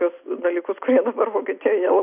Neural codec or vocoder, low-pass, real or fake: none; 3.6 kHz; real